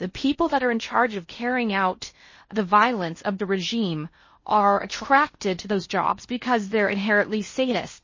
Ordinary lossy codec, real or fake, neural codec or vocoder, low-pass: MP3, 32 kbps; fake; codec, 16 kHz in and 24 kHz out, 0.6 kbps, FocalCodec, streaming, 4096 codes; 7.2 kHz